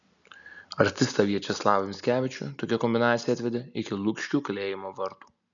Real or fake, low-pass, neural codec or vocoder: real; 7.2 kHz; none